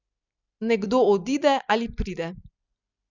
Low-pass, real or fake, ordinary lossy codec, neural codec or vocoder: 7.2 kHz; real; none; none